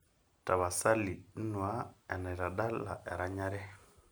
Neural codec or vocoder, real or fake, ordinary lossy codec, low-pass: none; real; none; none